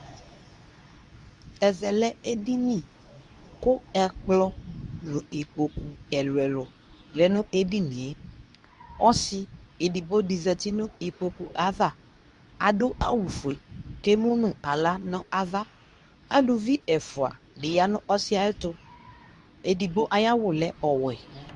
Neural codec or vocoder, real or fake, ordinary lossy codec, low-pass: codec, 24 kHz, 0.9 kbps, WavTokenizer, medium speech release version 2; fake; Opus, 64 kbps; 10.8 kHz